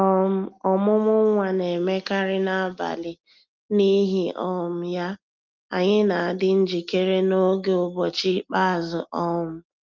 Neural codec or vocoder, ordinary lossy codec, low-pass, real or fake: none; Opus, 32 kbps; 7.2 kHz; real